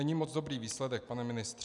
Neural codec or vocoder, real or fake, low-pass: none; real; 10.8 kHz